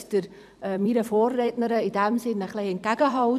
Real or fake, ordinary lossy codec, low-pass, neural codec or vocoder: fake; none; 14.4 kHz; vocoder, 44.1 kHz, 128 mel bands every 256 samples, BigVGAN v2